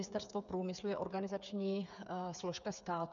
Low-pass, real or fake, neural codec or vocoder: 7.2 kHz; fake; codec, 16 kHz, 8 kbps, FreqCodec, smaller model